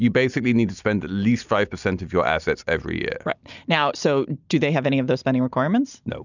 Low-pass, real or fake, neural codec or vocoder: 7.2 kHz; real; none